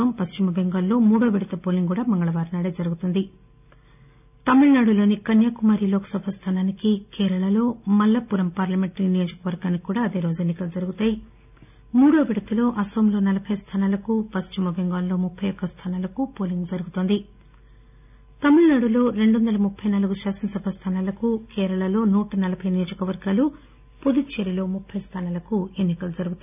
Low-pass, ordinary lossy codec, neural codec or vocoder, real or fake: 3.6 kHz; none; none; real